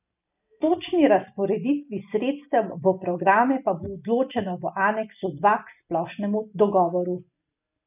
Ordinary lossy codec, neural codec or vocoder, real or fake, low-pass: none; none; real; 3.6 kHz